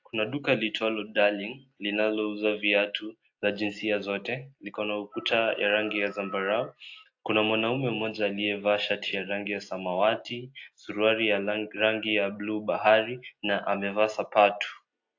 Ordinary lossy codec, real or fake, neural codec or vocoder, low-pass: AAC, 48 kbps; real; none; 7.2 kHz